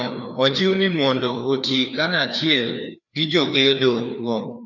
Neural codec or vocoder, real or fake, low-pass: codec, 16 kHz, 2 kbps, FreqCodec, larger model; fake; 7.2 kHz